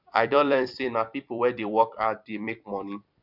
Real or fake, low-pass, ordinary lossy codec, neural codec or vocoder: fake; 5.4 kHz; none; vocoder, 44.1 kHz, 128 mel bands every 256 samples, BigVGAN v2